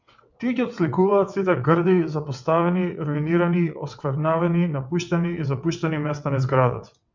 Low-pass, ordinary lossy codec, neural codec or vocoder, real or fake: 7.2 kHz; MP3, 64 kbps; vocoder, 22.05 kHz, 80 mel bands, WaveNeXt; fake